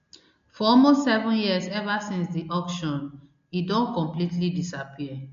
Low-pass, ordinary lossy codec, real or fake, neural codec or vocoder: 7.2 kHz; MP3, 48 kbps; real; none